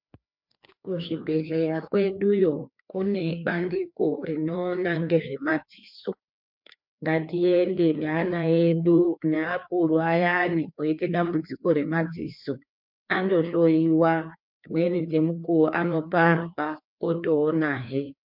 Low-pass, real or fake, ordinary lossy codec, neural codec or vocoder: 5.4 kHz; fake; MP3, 48 kbps; codec, 16 kHz, 2 kbps, FreqCodec, larger model